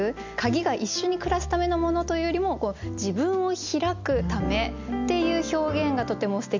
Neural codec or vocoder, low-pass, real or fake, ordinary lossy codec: none; 7.2 kHz; real; MP3, 64 kbps